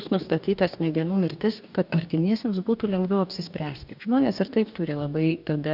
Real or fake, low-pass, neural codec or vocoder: fake; 5.4 kHz; codec, 44.1 kHz, 2.6 kbps, DAC